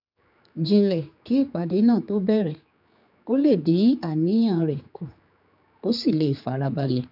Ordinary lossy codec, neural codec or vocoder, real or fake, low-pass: none; codec, 16 kHz, 4 kbps, X-Codec, HuBERT features, trained on general audio; fake; 5.4 kHz